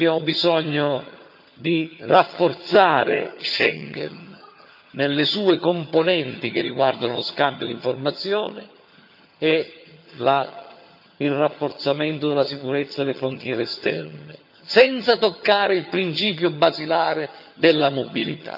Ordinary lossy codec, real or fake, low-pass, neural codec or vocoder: none; fake; 5.4 kHz; vocoder, 22.05 kHz, 80 mel bands, HiFi-GAN